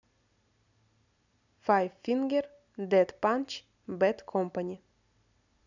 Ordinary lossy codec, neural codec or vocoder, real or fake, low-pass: none; none; real; 7.2 kHz